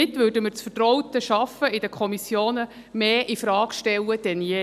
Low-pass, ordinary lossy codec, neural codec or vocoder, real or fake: 14.4 kHz; none; none; real